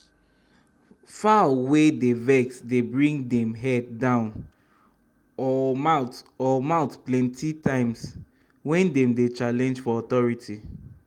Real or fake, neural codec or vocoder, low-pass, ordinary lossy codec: real; none; 19.8 kHz; Opus, 32 kbps